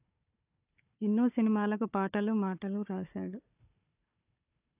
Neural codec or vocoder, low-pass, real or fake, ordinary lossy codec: codec, 16 kHz, 4 kbps, FunCodec, trained on Chinese and English, 50 frames a second; 3.6 kHz; fake; AAC, 32 kbps